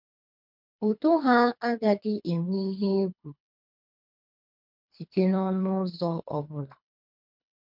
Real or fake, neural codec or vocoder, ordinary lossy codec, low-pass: fake; codec, 24 kHz, 3 kbps, HILCodec; MP3, 48 kbps; 5.4 kHz